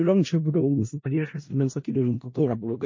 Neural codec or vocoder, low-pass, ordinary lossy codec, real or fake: codec, 16 kHz in and 24 kHz out, 0.4 kbps, LongCat-Audio-Codec, four codebook decoder; 7.2 kHz; MP3, 32 kbps; fake